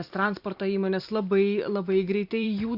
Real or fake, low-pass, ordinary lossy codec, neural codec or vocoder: fake; 5.4 kHz; Opus, 64 kbps; vocoder, 44.1 kHz, 128 mel bands every 512 samples, BigVGAN v2